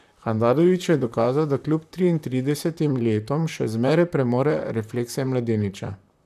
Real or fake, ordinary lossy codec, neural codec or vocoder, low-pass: fake; none; vocoder, 44.1 kHz, 128 mel bands, Pupu-Vocoder; 14.4 kHz